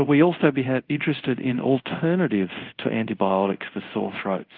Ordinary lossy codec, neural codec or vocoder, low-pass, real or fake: Opus, 32 kbps; codec, 24 kHz, 0.5 kbps, DualCodec; 5.4 kHz; fake